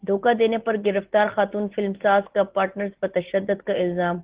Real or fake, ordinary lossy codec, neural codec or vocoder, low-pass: real; Opus, 16 kbps; none; 3.6 kHz